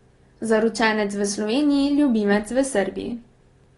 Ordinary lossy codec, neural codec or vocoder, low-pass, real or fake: AAC, 32 kbps; none; 10.8 kHz; real